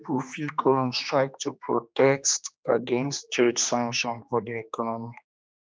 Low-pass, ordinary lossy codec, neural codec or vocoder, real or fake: none; none; codec, 16 kHz, 2 kbps, X-Codec, HuBERT features, trained on general audio; fake